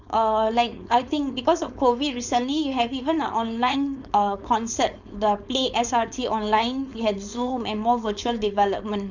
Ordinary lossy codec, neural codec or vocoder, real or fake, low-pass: none; codec, 16 kHz, 4.8 kbps, FACodec; fake; 7.2 kHz